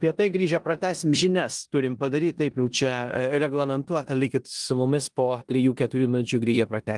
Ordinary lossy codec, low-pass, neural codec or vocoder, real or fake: Opus, 24 kbps; 10.8 kHz; codec, 16 kHz in and 24 kHz out, 0.9 kbps, LongCat-Audio-Codec, four codebook decoder; fake